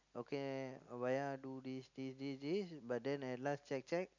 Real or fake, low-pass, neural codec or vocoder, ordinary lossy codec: real; 7.2 kHz; none; none